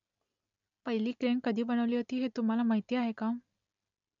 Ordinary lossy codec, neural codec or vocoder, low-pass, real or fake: none; none; 7.2 kHz; real